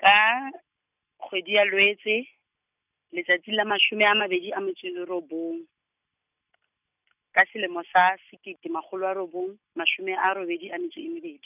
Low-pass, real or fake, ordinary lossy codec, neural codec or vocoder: 3.6 kHz; real; none; none